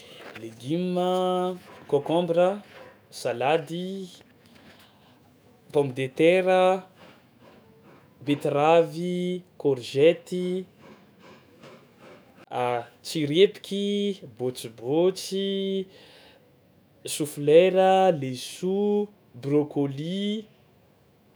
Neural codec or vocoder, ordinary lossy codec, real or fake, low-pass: autoencoder, 48 kHz, 128 numbers a frame, DAC-VAE, trained on Japanese speech; none; fake; none